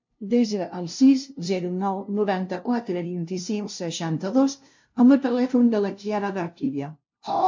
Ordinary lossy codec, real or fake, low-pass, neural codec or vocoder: MP3, 48 kbps; fake; 7.2 kHz; codec, 16 kHz, 0.5 kbps, FunCodec, trained on LibriTTS, 25 frames a second